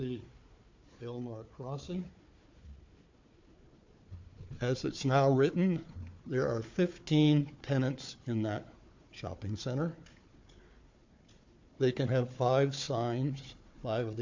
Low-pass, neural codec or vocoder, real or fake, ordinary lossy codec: 7.2 kHz; codec, 16 kHz, 4 kbps, FunCodec, trained on Chinese and English, 50 frames a second; fake; MP3, 48 kbps